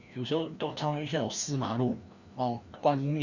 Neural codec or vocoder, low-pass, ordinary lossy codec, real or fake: codec, 16 kHz, 1 kbps, FreqCodec, larger model; 7.2 kHz; Opus, 64 kbps; fake